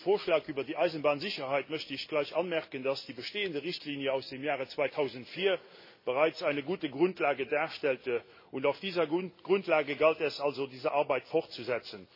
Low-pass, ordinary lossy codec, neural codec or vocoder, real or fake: 5.4 kHz; MP3, 24 kbps; none; real